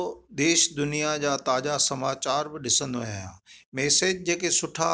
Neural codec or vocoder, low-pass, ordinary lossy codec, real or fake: none; none; none; real